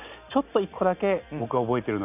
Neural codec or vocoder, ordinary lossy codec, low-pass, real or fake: none; none; 3.6 kHz; real